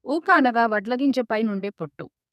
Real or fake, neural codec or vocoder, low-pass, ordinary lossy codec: fake; codec, 32 kHz, 1.9 kbps, SNAC; 14.4 kHz; none